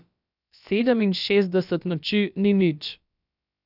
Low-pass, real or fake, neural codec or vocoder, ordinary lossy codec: 5.4 kHz; fake; codec, 16 kHz, about 1 kbps, DyCAST, with the encoder's durations; none